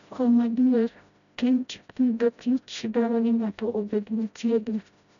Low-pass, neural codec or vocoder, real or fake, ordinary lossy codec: 7.2 kHz; codec, 16 kHz, 0.5 kbps, FreqCodec, smaller model; fake; none